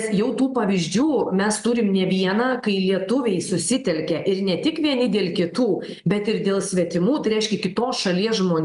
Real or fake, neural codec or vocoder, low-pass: real; none; 10.8 kHz